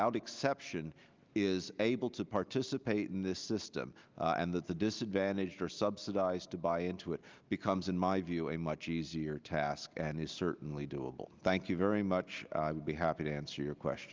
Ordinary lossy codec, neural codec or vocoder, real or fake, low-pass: Opus, 24 kbps; none; real; 7.2 kHz